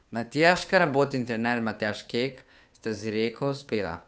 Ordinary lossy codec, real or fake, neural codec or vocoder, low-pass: none; fake; codec, 16 kHz, 2 kbps, FunCodec, trained on Chinese and English, 25 frames a second; none